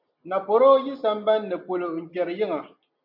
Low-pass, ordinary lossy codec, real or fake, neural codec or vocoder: 5.4 kHz; MP3, 48 kbps; real; none